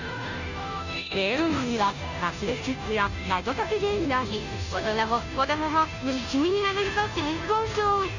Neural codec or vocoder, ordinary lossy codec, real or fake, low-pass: codec, 16 kHz, 0.5 kbps, FunCodec, trained on Chinese and English, 25 frames a second; none; fake; 7.2 kHz